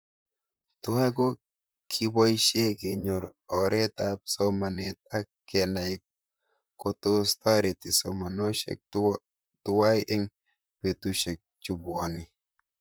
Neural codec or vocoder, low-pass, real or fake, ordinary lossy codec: vocoder, 44.1 kHz, 128 mel bands, Pupu-Vocoder; none; fake; none